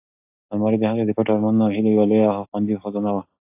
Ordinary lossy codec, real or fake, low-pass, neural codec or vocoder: AAC, 24 kbps; fake; 3.6 kHz; codec, 16 kHz, 6 kbps, DAC